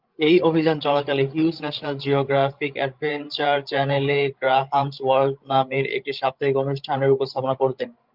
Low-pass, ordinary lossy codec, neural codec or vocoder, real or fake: 5.4 kHz; Opus, 24 kbps; codec, 16 kHz, 16 kbps, FreqCodec, larger model; fake